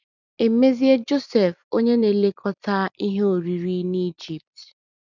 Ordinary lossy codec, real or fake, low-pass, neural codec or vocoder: none; fake; 7.2 kHz; vocoder, 44.1 kHz, 128 mel bands every 256 samples, BigVGAN v2